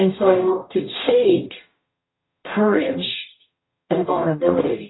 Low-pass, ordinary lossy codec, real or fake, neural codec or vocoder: 7.2 kHz; AAC, 16 kbps; fake; codec, 44.1 kHz, 0.9 kbps, DAC